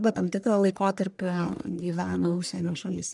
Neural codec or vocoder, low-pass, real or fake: codec, 44.1 kHz, 1.7 kbps, Pupu-Codec; 10.8 kHz; fake